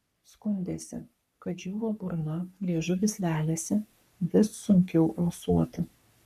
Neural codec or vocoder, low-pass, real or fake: codec, 44.1 kHz, 3.4 kbps, Pupu-Codec; 14.4 kHz; fake